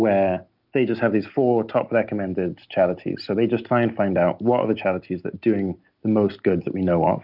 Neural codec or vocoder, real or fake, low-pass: none; real; 5.4 kHz